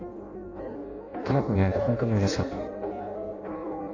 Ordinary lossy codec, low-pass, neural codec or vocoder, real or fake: AAC, 48 kbps; 7.2 kHz; codec, 16 kHz in and 24 kHz out, 0.6 kbps, FireRedTTS-2 codec; fake